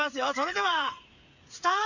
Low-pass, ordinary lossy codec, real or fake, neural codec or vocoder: 7.2 kHz; none; fake; codec, 16 kHz, 8 kbps, FreqCodec, smaller model